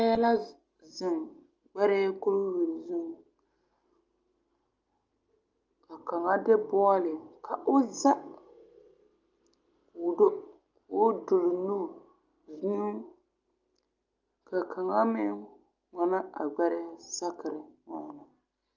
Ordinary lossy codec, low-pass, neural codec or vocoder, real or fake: Opus, 24 kbps; 7.2 kHz; none; real